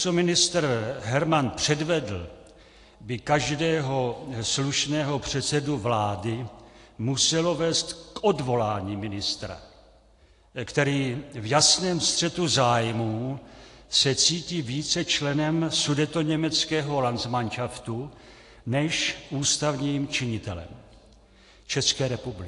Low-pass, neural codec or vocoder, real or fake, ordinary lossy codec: 10.8 kHz; none; real; AAC, 48 kbps